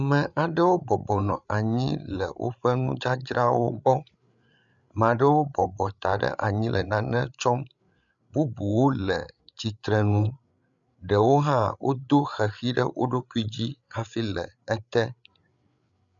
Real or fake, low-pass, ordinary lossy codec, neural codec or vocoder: fake; 7.2 kHz; MP3, 96 kbps; codec, 16 kHz, 8 kbps, FreqCodec, larger model